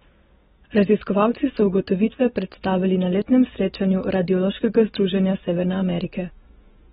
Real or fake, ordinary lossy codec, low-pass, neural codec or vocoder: real; AAC, 16 kbps; 19.8 kHz; none